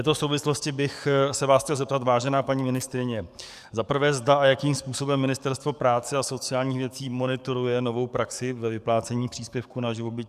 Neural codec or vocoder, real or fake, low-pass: codec, 44.1 kHz, 7.8 kbps, DAC; fake; 14.4 kHz